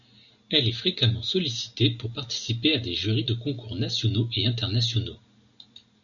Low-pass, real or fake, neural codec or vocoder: 7.2 kHz; real; none